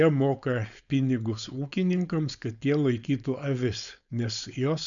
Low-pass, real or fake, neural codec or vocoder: 7.2 kHz; fake; codec, 16 kHz, 4.8 kbps, FACodec